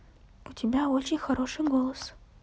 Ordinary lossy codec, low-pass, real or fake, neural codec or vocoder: none; none; real; none